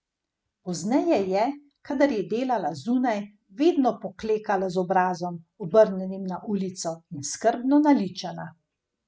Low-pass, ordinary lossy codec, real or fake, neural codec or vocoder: none; none; real; none